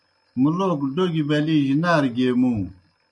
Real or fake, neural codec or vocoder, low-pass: real; none; 10.8 kHz